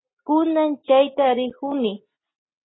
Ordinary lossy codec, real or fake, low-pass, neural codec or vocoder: AAC, 16 kbps; real; 7.2 kHz; none